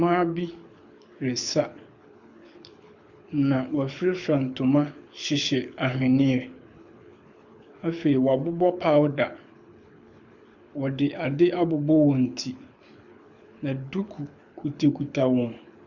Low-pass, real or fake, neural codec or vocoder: 7.2 kHz; fake; codec, 24 kHz, 6 kbps, HILCodec